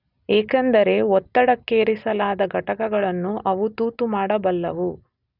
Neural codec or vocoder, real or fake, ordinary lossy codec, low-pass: none; real; none; 5.4 kHz